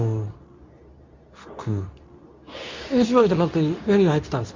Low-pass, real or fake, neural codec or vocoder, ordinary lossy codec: 7.2 kHz; fake; codec, 24 kHz, 0.9 kbps, WavTokenizer, medium speech release version 2; MP3, 64 kbps